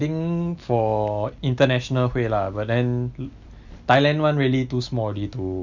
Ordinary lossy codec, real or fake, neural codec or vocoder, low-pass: none; real; none; 7.2 kHz